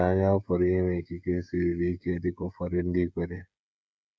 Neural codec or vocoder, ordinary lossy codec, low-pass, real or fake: codec, 16 kHz, 16 kbps, FreqCodec, smaller model; none; none; fake